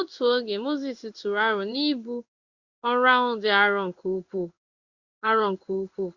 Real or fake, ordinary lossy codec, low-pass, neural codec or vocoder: fake; Opus, 64 kbps; 7.2 kHz; codec, 16 kHz in and 24 kHz out, 1 kbps, XY-Tokenizer